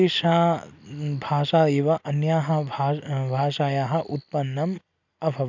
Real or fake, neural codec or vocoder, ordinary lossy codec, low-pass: real; none; none; 7.2 kHz